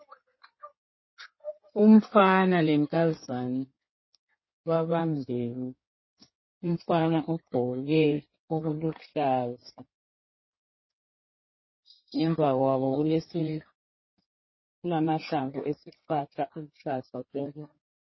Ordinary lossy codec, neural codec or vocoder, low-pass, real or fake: MP3, 24 kbps; codec, 16 kHz in and 24 kHz out, 2.2 kbps, FireRedTTS-2 codec; 7.2 kHz; fake